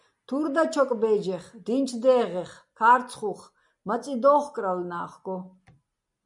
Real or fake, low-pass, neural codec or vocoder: real; 10.8 kHz; none